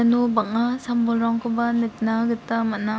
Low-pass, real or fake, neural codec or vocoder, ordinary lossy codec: none; real; none; none